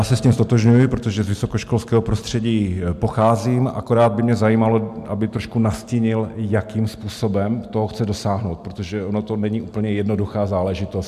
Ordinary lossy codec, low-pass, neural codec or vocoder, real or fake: MP3, 96 kbps; 14.4 kHz; none; real